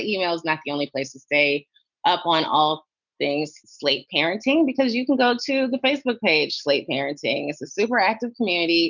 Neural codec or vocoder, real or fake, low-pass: none; real; 7.2 kHz